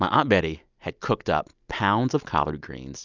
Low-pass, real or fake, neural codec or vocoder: 7.2 kHz; real; none